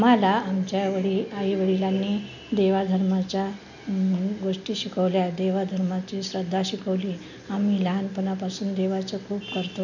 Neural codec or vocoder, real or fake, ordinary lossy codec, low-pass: none; real; none; 7.2 kHz